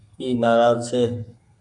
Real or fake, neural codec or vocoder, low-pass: fake; codec, 32 kHz, 1.9 kbps, SNAC; 10.8 kHz